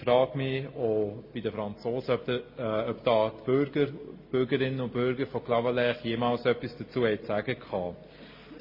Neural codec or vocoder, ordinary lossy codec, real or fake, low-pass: none; MP3, 24 kbps; real; 5.4 kHz